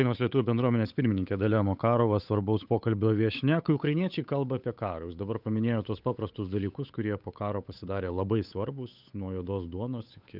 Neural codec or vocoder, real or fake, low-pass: codec, 16 kHz, 8 kbps, FunCodec, trained on Chinese and English, 25 frames a second; fake; 5.4 kHz